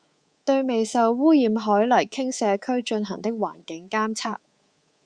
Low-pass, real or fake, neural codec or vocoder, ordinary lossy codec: 9.9 kHz; fake; codec, 24 kHz, 3.1 kbps, DualCodec; Opus, 64 kbps